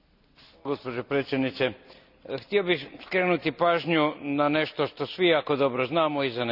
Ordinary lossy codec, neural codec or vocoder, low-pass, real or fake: none; none; 5.4 kHz; real